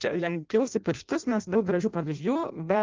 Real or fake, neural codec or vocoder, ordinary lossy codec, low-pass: fake; codec, 16 kHz in and 24 kHz out, 0.6 kbps, FireRedTTS-2 codec; Opus, 32 kbps; 7.2 kHz